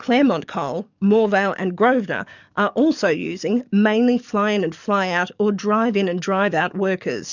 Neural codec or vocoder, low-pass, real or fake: codec, 44.1 kHz, 7.8 kbps, DAC; 7.2 kHz; fake